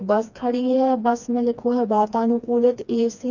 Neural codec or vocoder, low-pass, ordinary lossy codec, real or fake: codec, 16 kHz, 2 kbps, FreqCodec, smaller model; 7.2 kHz; none; fake